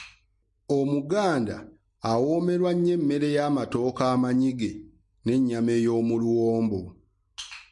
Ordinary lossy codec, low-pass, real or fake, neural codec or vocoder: MP3, 48 kbps; 10.8 kHz; real; none